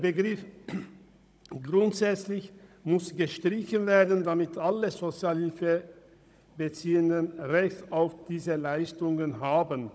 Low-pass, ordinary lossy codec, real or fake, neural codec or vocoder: none; none; fake; codec, 16 kHz, 16 kbps, FunCodec, trained on Chinese and English, 50 frames a second